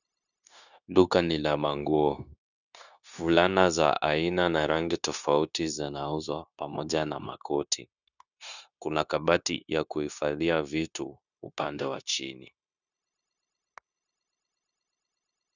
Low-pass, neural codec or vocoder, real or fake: 7.2 kHz; codec, 16 kHz, 0.9 kbps, LongCat-Audio-Codec; fake